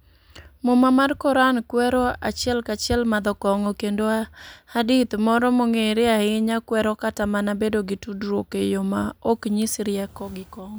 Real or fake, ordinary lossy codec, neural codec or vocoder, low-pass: real; none; none; none